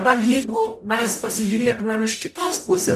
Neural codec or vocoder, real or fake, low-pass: codec, 44.1 kHz, 0.9 kbps, DAC; fake; 14.4 kHz